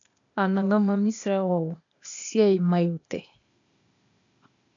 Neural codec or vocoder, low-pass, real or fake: codec, 16 kHz, 0.8 kbps, ZipCodec; 7.2 kHz; fake